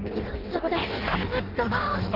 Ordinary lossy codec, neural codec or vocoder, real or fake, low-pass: Opus, 16 kbps; codec, 16 kHz in and 24 kHz out, 0.6 kbps, FireRedTTS-2 codec; fake; 5.4 kHz